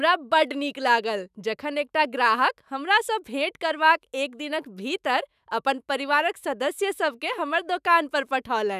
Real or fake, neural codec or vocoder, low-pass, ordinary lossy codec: fake; autoencoder, 48 kHz, 128 numbers a frame, DAC-VAE, trained on Japanese speech; 14.4 kHz; none